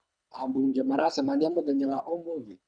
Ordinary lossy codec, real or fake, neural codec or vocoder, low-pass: none; fake; codec, 24 kHz, 3 kbps, HILCodec; 9.9 kHz